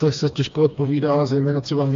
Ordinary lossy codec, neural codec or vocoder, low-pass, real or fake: AAC, 64 kbps; codec, 16 kHz, 2 kbps, FreqCodec, smaller model; 7.2 kHz; fake